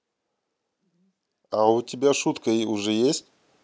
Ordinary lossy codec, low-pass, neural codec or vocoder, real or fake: none; none; none; real